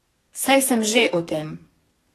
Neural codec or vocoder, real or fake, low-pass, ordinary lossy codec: codec, 32 kHz, 1.9 kbps, SNAC; fake; 14.4 kHz; AAC, 48 kbps